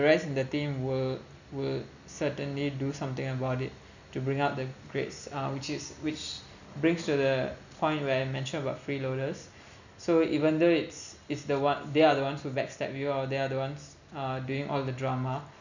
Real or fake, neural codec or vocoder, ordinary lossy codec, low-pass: real; none; none; 7.2 kHz